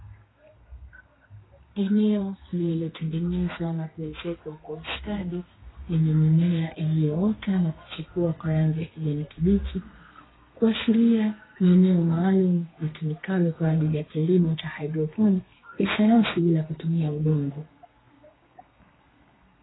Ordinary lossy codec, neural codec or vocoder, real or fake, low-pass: AAC, 16 kbps; codec, 32 kHz, 1.9 kbps, SNAC; fake; 7.2 kHz